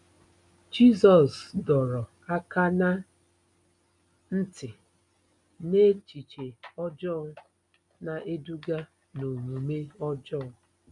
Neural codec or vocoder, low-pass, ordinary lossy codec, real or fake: none; 10.8 kHz; none; real